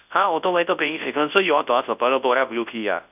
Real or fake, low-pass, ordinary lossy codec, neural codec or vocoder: fake; 3.6 kHz; none; codec, 24 kHz, 0.9 kbps, WavTokenizer, large speech release